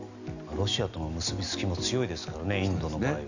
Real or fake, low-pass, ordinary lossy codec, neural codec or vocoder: real; 7.2 kHz; none; none